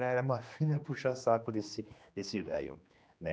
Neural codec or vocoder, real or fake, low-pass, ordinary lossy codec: codec, 16 kHz, 2 kbps, X-Codec, HuBERT features, trained on general audio; fake; none; none